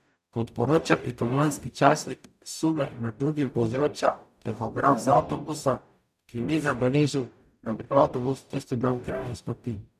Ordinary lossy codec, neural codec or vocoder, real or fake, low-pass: none; codec, 44.1 kHz, 0.9 kbps, DAC; fake; 14.4 kHz